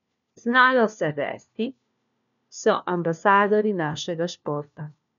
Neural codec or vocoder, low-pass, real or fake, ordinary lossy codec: codec, 16 kHz, 1 kbps, FunCodec, trained on LibriTTS, 50 frames a second; 7.2 kHz; fake; none